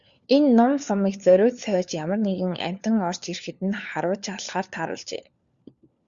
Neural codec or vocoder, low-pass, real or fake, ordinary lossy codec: codec, 16 kHz, 4 kbps, FunCodec, trained on LibriTTS, 50 frames a second; 7.2 kHz; fake; Opus, 64 kbps